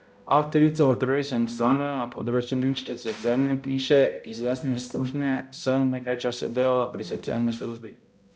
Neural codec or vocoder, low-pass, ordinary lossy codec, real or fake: codec, 16 kHz, 0.5 kbps, X-Codec, HuBERT features, trained on balanced general audio; none; none; fake